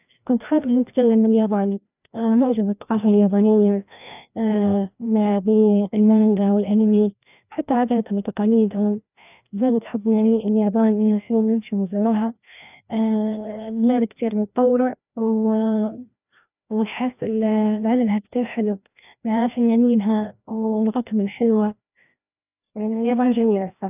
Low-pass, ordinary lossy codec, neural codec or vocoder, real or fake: 3.6 kHz; none; codec, 16 kHz, 1 kbps, FreqCodec, larger model; fake